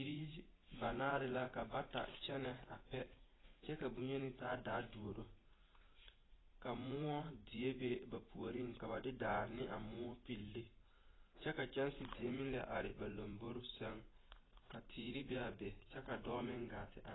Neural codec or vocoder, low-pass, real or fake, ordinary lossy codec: vocoder, 44.1 kHz, 80 mel bands, Vocos; 7.2 kHz; fake; AAC, 16 kbps